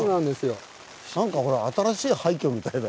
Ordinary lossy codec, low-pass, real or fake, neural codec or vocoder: none; none; real; none